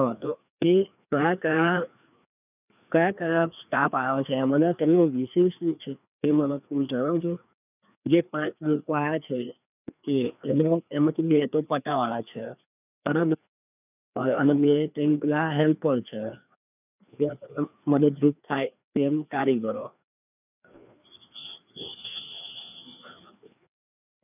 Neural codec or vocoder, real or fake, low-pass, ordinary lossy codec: codec, 16 kHz, 2 kbps, FreqCodec, larger model; fake; 3.6 kHz; none